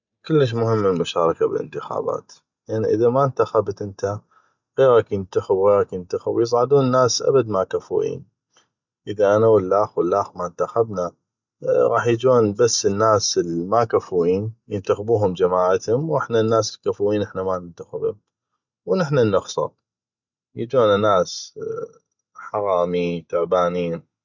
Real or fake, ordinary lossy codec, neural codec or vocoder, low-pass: real; none; none; 7.2 kHz